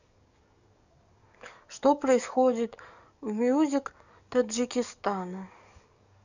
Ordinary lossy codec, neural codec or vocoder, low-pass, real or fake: none; codec, 44.1 kHz, 7.8 kbps, DAC; 7.2 kHz; fake